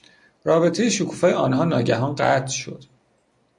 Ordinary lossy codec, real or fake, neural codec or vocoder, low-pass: MP3, 48 kbps; real; none; 10.8 kHz